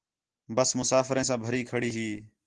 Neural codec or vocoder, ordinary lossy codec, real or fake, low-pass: none; Opus, 16 kbps; real; 10.8 kHz